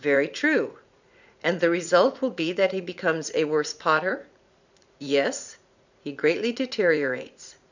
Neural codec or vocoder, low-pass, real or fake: vocoder, 44.1 kHz, 80 mel bands, Vocos; 7.2 kHz; fake